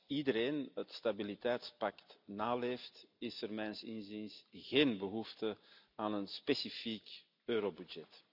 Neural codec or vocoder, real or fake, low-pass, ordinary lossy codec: none; real; 5.4 kHz; none